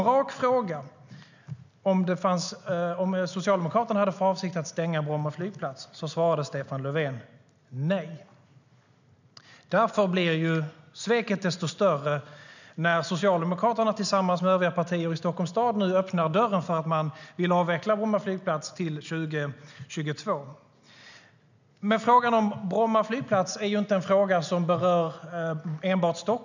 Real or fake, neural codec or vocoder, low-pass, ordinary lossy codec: real; none; 7.2 kHz; none